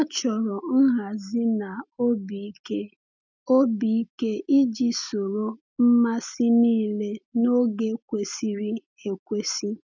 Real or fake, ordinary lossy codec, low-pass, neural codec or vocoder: real; none; 7.2 kHz; none